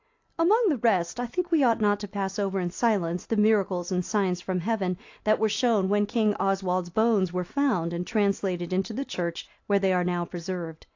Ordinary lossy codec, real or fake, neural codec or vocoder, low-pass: AAC, 48 kbps; real; none; 7.2 kHz